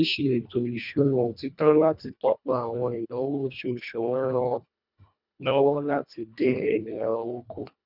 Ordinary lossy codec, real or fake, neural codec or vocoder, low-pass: none; fake; codec, 24 kHz, 1.5 kbps, HILCodec; 5.4 kHz